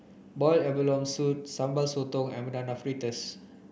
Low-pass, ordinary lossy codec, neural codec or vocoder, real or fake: none; none; none; real